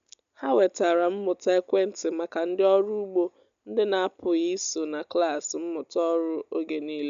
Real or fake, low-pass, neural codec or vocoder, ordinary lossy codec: real; 7.2 kHz; none; none